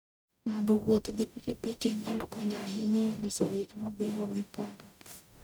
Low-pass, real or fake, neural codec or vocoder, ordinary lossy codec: none; fake; codec, 44.1 kHz, 0.9 kbps, DAC; none